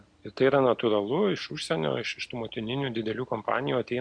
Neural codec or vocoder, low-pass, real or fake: none; 9.9 kHz; real